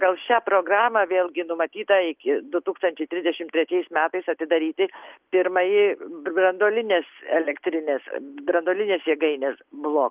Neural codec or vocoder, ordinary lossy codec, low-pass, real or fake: none; Opus, 32 kbps; 3.6 kHz; real